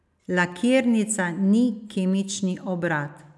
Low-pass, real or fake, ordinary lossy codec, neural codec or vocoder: none; real; none; none